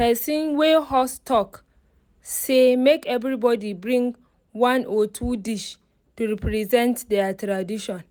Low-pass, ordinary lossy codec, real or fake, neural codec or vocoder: none; none; real; none